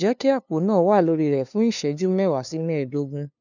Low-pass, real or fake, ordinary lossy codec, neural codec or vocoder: 7.2 kHz; fake; none; codec, 16 kHz, 2 kbps, FunCodec, trained on LibriTTS, 25 frames a second